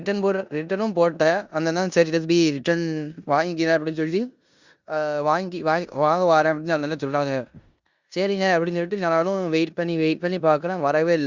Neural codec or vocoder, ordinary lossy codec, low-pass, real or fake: codec, 16 kHz in and 24 kHz out, 0.9 kbps, LongCat-Audio-Codec, four codebook decoder; Opus, 64 kbps; 7.2 kHz; fake